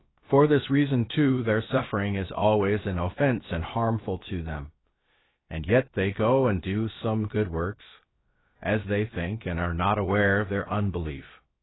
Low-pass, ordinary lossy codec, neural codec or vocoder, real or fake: 7.2 kHz; AAC, 16 kbps; codec, 16 kHz, about 1 kbps, DyCAST, with the encoder's durations; fake